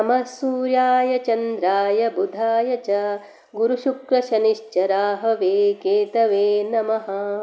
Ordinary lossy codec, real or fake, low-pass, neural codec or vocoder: none; real; none; none